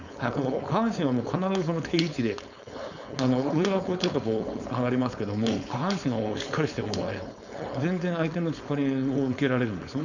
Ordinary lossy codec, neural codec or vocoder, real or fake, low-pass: none; codec, 16 kHz, 4.8 kbps, FACodec; fake; 7.2 kHz